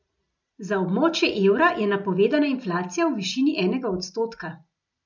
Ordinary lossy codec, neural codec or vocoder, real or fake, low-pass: none; none; real; 7.2 kHz